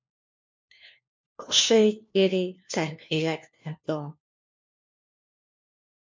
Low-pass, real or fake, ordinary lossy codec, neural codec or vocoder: 7.2 kHz; fake; MP3, 48 kbps; codec, 16 kHz, 1 kbps, FunCodec, trained on LibriTTS, 50 frames a second